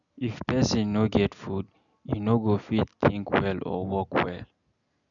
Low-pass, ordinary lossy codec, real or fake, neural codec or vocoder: 7.2 kHz; MP3, 96 kbps; real; none